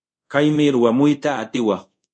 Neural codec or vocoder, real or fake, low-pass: codec, 24 kHz, 0.5 kbps, DualCodec; fake; 9.9 kHz